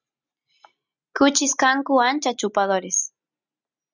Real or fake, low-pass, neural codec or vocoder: real; 7.2 kHz; none